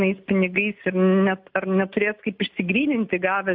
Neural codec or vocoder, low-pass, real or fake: none; 5.4 kHz; real